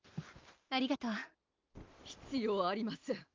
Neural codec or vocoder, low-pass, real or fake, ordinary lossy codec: none; 7.2 kHz; real; Opus, 32 kbps